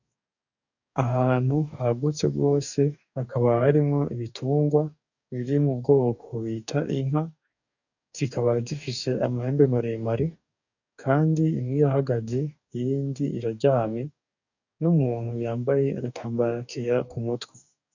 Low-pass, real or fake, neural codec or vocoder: 7.2 kHz; fake; codec, 44.1 kHz, 2.6 kbps, DAC